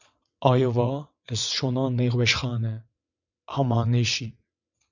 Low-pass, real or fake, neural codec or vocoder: 7.2 kHz; fake; vocoder, 22.05 kHz, 80 mel bands, WaveNeXt